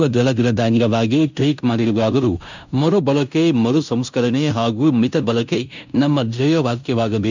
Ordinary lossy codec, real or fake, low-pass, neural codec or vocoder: none; fake; 7.2 kHz; codec, 16 kHz in and 24 kHz out, 0.9 kbps, LongCat-Audio-Codec, fine tuned four codebook decoder